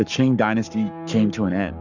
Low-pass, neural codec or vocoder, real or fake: 7.2 kHz; codec, 44.1 kHz, 7.8 kbps, Pupu-Codec; fake